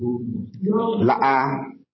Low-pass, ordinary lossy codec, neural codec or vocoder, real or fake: 7.2 kHz; MP3, 24 kbps; vocoder, 44.1 kHz, 128 mel bands every 256 samples, BigVGAN v2; fake